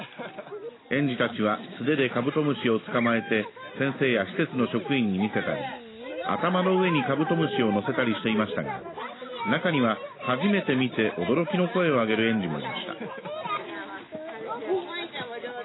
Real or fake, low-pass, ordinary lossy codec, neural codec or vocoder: real; 7.2 kHz; AAC, 16 kbps; none